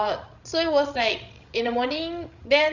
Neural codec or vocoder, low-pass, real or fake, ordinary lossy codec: codec, 16 kHz, 16 kbps, FreqCodec, larger model; 7.2 kHz; fake; none